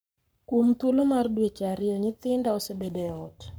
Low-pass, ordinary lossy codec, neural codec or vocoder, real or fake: none; none; codec, 44.1 kHz, 7.8 kbps, Pupu-Codec; fake